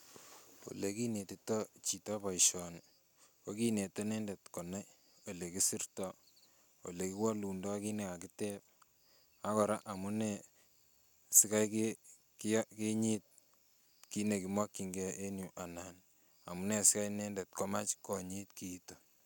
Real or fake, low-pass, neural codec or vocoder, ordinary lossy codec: real; none; none; none